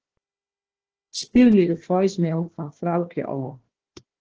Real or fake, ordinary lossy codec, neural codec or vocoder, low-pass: fake; Opus, 16 kbps; codec, 16 kHz, 1 kbps, FunCodec, trained on Chinese and English, 50 frames a second; 7.2 kHz